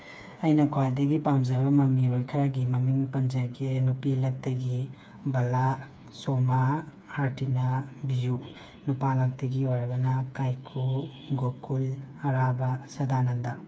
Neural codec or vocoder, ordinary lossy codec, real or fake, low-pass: codec, 16 kHz, 4 kbps, FreqCodec, smaller model; none; fake; none